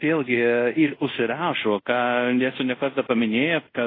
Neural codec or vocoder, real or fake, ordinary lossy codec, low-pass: codec, 24 kHz, 0.5 kbps, DualCodec; fake; AAC, 24 kbps; 5.4 kHz